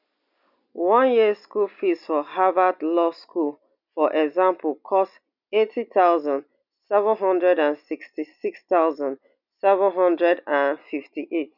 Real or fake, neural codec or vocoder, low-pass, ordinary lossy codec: real; none; 5.4 kHz; none